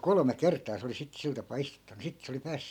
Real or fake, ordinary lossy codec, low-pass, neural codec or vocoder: real; none; 19.8 kHz; none